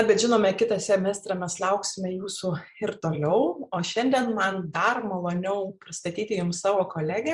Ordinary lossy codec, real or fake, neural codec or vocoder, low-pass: Opus, 64 kbps; fake; vocoder, 44.1 kHz, 128 mel bands every 256 samples, BigVGAN v2; 10.8 kHz